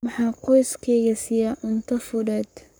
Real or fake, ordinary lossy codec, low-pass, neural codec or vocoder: fake; none; none; codec, 44.1 kHz, 7.8 kbps, Pupu-Codec